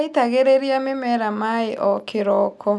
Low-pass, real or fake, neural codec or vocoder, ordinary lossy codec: none; real; none; none